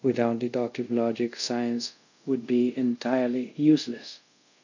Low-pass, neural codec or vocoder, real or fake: 7.2 kHz; codec, 24 kHz, 0.5 kbps, DualCodec; fake